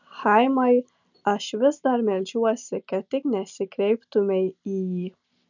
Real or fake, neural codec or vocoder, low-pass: real; none; 7.2 kHz